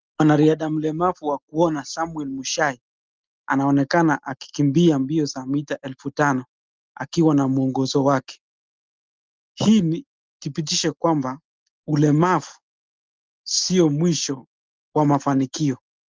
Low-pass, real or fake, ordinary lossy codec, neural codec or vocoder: 7.2 kHz; real; Opus, 32 kbps; none